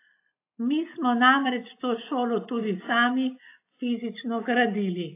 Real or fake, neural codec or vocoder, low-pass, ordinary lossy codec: real; none; 3.6 kHz; AAC, 24 kbps